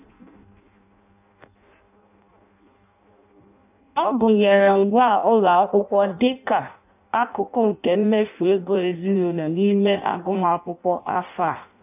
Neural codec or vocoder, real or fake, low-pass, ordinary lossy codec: codec, 16 kHz in and 24 kHz out, 0.6 kbps, FireRedTTS-2 codec; fake; 3.6 kHz; none